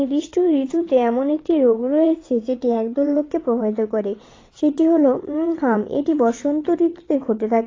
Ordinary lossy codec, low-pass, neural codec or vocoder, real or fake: AAC, 32 kbps; 7.2 kHz; vocoder, 22.05 kHz, 80 mel bands, WaveNeXt; fake